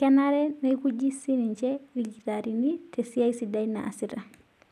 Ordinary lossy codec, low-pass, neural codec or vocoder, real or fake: none; 14.4 kHz; none; real